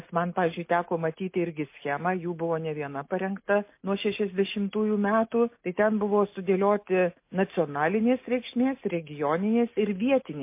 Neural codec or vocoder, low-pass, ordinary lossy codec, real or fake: none; 3.6 kHz; MP3, 24 kbps; real